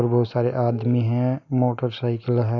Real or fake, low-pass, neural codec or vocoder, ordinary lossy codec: real; 7.2 kHz; none; none